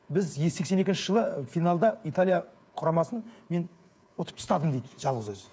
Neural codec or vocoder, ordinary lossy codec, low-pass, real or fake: codec, 16 kHz, 8 kbps, FreqCodec, smaller model; none; none; fake